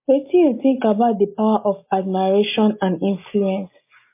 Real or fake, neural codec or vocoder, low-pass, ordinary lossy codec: real; none; 3.6 kHz; MP3, 24 kbps